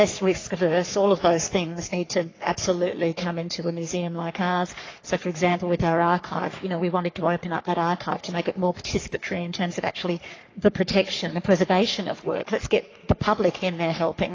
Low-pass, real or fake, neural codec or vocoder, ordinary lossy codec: 7.2 kHz; fake; codec, 44.1 kHz, 3.4 kbps, Pupu-Codec; AAC, 32 kbps